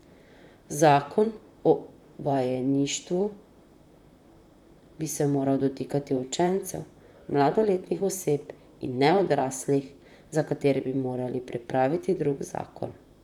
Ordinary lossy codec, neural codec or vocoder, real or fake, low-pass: none; vocoder, 44.1 kHz, 128 mel bands, Pupu-Vocoder; fake; 19.8 kHz